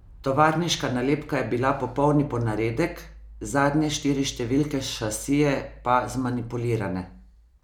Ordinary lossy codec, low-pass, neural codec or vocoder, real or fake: none; 19.8 kHz; none; real